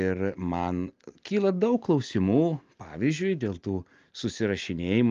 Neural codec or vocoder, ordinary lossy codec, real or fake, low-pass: none; Opus, 32 kbps; real; 7.2 kHz